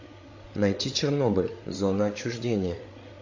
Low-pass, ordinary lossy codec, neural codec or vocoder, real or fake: 7.2 kHz; AAC, 48 kbps; codec, 16 kHz, 8 kbps, FreqCodec, larger model; fake